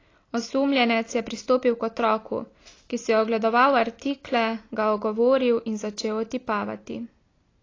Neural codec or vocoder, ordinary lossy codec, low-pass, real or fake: none; AAC, 32 kbps; 7.2 kHz; real